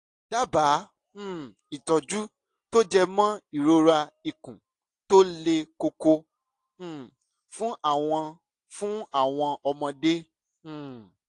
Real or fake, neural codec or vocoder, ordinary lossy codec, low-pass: real; none; AAC, 48 kbps; 10.8 kHz